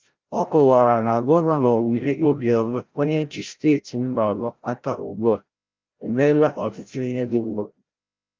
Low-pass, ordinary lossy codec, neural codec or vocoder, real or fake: 7.2 kHz; Opus, 32 kbps; codec, 16 kHz, 0.5 kbps, FreqCodec, larger model; fake